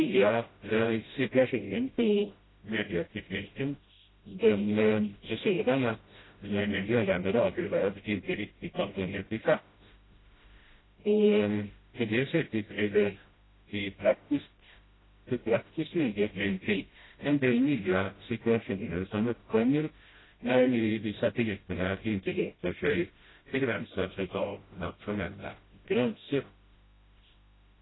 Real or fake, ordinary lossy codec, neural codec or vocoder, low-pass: fake; AAC, 16 kbps; codec, 16 kHz, 0.5 kbps, FreqCodec, smaller model; 7.2 kHz